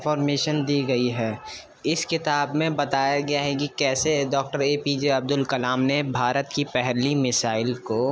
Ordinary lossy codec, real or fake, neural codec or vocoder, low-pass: none; real; none; none